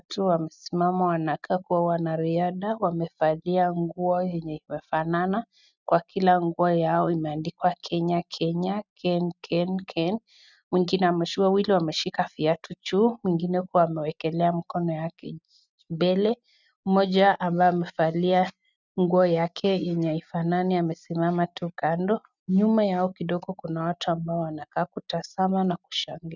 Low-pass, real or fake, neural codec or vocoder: 7.2 kHz; real; none